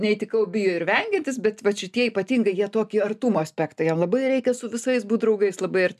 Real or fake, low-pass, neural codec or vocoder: real; 14.4 kHz; none